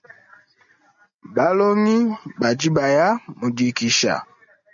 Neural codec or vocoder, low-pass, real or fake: none; 7.2 kHz; real